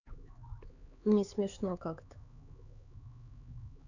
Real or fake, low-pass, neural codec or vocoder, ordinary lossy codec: fake; 7.2 kHz; codec, 16 kHz, 4 kbps, X-Codec, HuBERT features, trained on LibriSpeech; none